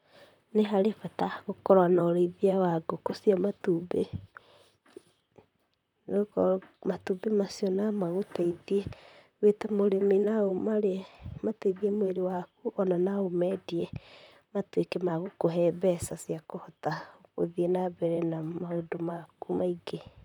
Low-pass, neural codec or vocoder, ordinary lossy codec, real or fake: 19.8 kHz; vocoder, 44.1 kHz, 128 mel bands, Pupu-Vocoder; none; fake